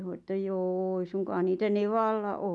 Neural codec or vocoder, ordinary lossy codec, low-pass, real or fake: none; none; none; real